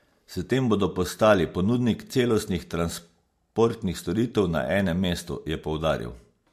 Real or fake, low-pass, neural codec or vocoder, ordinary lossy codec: real; 14.4 kHz; none; MP3, 64 kbps